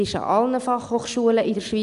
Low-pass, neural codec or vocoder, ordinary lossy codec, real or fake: 10.8 kHz; none; none; real